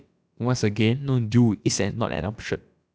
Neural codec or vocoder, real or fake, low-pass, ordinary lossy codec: codec, 16 kHz, about 1 kbps, DyCAST, with the encoder's durations; fake; none; none